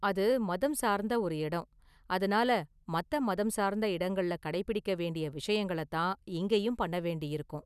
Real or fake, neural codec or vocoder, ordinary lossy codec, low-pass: real; none; none; 14.4 kHz